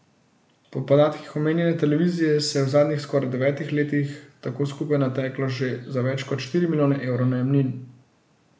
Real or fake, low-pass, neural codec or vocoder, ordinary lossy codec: real; none; none; none